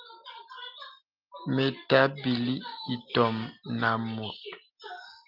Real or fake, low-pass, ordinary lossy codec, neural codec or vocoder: real; 5.4 kHz; Opus, 32 kbps; none